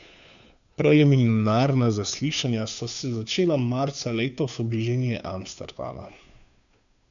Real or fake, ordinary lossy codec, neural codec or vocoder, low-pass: fake; none; codec, 16 kHz, 2 kbps, FunCodec, trained on Chinese and English, 25 frames a second; 7.2 kHz